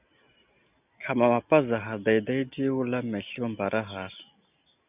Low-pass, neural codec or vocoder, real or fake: 3.6 kHz; none; real